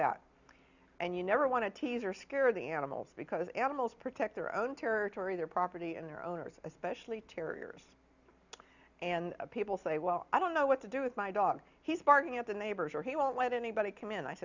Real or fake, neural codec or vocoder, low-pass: real; none; 7.2 kHz